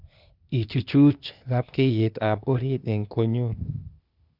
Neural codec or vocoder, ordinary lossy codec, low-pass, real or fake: codec, 16 kHz, 0.8 kbps, ZipCodec; Opus, 64 kbps; 5.4 kHz; fake